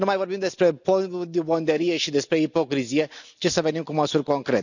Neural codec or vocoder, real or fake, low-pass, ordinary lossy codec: none; real; 7.2 kHz; none